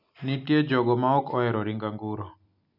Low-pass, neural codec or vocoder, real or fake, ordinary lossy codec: 5.4 kHz; none; real; none